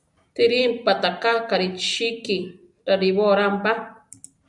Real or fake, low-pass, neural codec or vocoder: real; 10.8 kHz; none